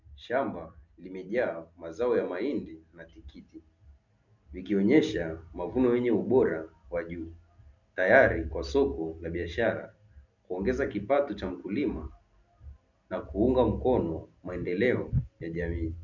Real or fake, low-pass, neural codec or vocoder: real; 7.2 kHz; none